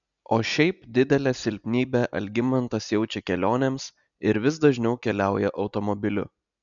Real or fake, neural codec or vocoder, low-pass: real; none; 7.2 kHz